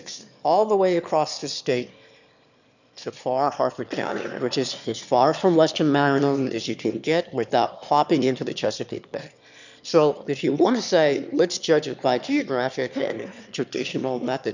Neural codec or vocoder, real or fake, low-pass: autoencoder, 22.05 kHz, a latent of 192 numbers a frame, VITS, trained on one speaker; fake; 7.2 kHz